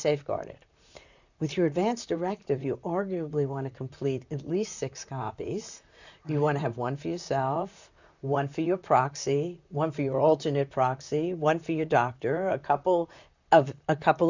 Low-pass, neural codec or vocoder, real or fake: 7.2 kHz; vocoder, 44.1 kHz, 128 mel bands, Pupu-Vocoder; fake